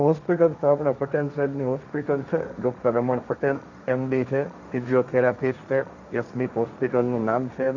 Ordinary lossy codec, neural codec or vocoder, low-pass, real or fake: none; codec, 16 kHz, 1.1 kbps, Voila-Tokenizer; 7.2 kHz; fake